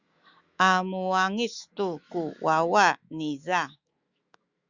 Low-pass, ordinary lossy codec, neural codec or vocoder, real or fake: 7.2 kHz; Opus, 64 kbps; autoencoder, 48 kHz, 128 numbers a frame, DAC-VAE, trained on Japanese speech; fake